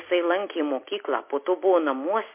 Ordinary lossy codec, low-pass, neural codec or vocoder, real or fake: MP3, 24 kbps; 3.6 kHz; none; real